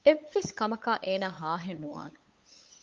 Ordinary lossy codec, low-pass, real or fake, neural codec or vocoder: Opus, 32 kbps; 7.2 kHz; fake; codec, 16 kHz, 8 kbps, FunCodec, trained on LibriTTS, 25 frames a second